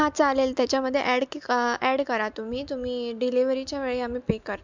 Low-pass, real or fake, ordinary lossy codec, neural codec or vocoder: 7.2 kHz; real; MP3, 64 kbps; none